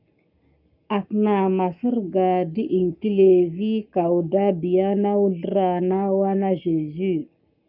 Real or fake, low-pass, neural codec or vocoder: fake; 5.4 kHz; codec, 44.1 kHz, 7.8 kbps, Pupu-Codec